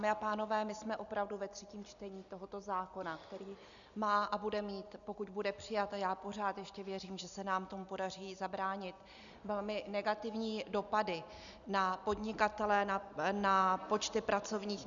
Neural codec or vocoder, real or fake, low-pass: none; real; 7.2 kHz